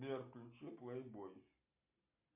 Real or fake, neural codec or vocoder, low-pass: real; none; 3.6 kHz